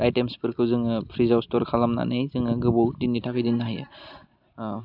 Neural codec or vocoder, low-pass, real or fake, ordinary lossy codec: none; 5.4 kHz; real; none